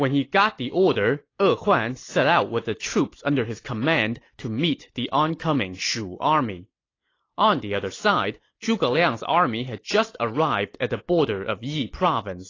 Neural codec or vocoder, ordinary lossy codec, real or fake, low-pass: none; AAC, 32 kbps; real; 7.2 kHz